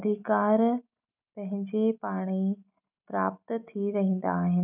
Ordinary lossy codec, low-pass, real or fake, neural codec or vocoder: none; 3.6 kHz; real; none